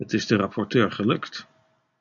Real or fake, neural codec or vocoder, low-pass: real; none; 7.2 kHz